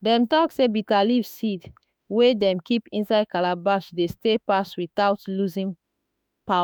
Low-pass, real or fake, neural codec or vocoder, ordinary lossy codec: none; fake; autoencoder, 48 kHz, 32 numbers a frame, DAC-VAE, trained on Japanese speech; none